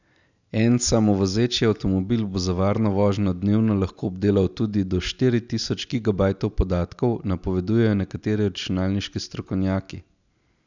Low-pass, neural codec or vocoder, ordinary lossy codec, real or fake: 7.2 kHz; none; none; real